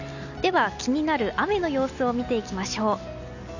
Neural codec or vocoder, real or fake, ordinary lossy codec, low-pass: none; real; none; 7.2 kHz